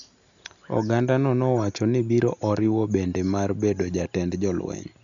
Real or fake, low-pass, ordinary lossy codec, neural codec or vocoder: real; 7.2 kHz; none; none